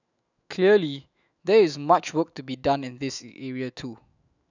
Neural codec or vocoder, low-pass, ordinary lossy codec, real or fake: autoencoder, 48 kHz, 128 numbers a frame, DAC-VAE, trained on Japanese speech; 7.2 kHz; none; fake